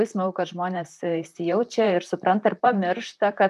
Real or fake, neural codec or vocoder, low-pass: fake; vocoder, 44.1 kHz, 128 mel bands, Pupu-Vocoder; 14.4 kHz